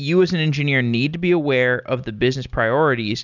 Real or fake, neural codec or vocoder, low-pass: real; none; 7.2 kHz